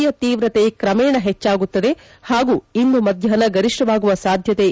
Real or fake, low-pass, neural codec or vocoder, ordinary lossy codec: real; none; none; none